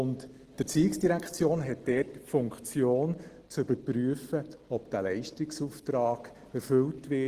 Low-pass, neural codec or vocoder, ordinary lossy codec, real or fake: 14.4 kHz; none; Opus, 16 kbps; real